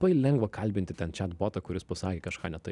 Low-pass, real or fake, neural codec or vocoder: 10.8 kHz; real; none